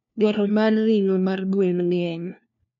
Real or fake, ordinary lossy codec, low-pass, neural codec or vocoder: fake; none; 7.2 kHz; codec, 16 kHz, 1 kbps, FunCodec, trained on LibriTTS, 50 frames a second